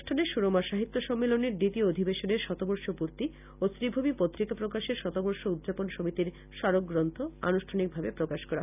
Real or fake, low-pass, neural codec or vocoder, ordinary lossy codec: real; 3.6 kHz; none; none